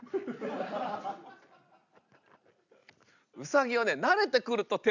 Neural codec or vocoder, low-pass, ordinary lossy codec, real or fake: none; 7.2 kHz; none; real